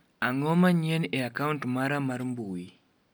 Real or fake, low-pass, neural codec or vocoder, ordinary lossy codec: real; none; none; none